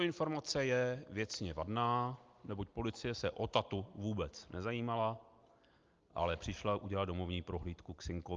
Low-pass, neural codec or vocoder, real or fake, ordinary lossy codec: 7.2 kHz; none; real; Opus, 24 kbps